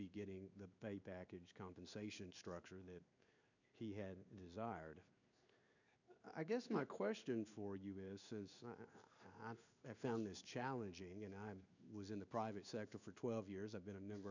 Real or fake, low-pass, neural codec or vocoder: fake; 7.2 kHz; codec, 16 kHz in and 24 kHz out, 1 kbps, XY-Tokenizer